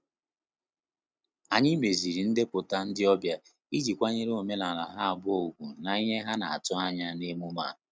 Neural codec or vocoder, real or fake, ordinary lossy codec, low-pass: none; real; none; none